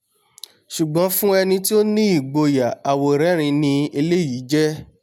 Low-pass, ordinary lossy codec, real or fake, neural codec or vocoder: 19.8 kHz; none; real; none